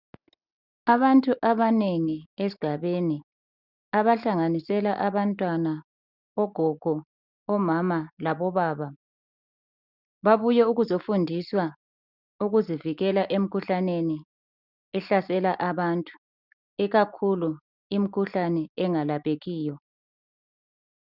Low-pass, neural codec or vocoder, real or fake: 5.4 kHz; none; real